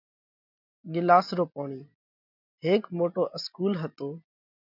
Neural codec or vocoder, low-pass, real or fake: none; 5.4 kHz; real